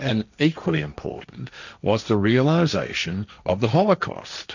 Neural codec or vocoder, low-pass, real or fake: codec, 16 kHz, 1.1 kbps, Voila-Tokenizer; 7.2 kHz; fake